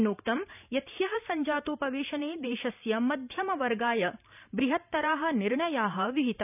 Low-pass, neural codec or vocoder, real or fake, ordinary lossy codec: 3.6 kHz; vocoder, 44.1 kHz, 128 mel bands every 512 samples, BigVGAN v2; fake; none